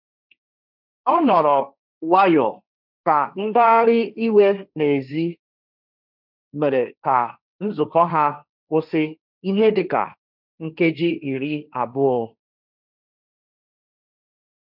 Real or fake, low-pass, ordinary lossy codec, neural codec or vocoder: fake; 5.4 kHz; none; codec, 16 kHz, 1.1 kbps, Voila-Tokenizer